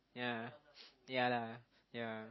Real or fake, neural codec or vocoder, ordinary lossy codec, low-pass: real; none; MP3, 24 kbps; 7.2 kHz